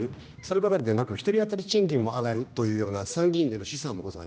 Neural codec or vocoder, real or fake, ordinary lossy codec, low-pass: codec, 16 kHz, 1 kbps, X-Codec, HuBERT features, trained on general audio; fake; none; none